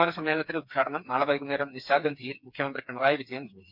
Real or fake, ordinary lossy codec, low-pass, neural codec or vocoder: fake; none; 5.4 kHz; codec, 16 kHz, 4 kbps, FreqCodec, smaller model